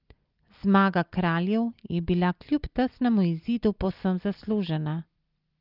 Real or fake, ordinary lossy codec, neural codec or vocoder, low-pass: real; Opus, 24 kbps; none; 5.4 kHz